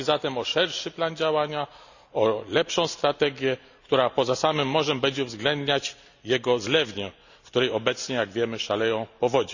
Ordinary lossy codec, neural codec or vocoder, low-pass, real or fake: none; none; 7.2 kHz; real